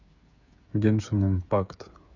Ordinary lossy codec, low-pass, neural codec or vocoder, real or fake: none; 7.2 kHz; codec, 16 kHz, 8 kbps, FreqCodec, smaller model; fake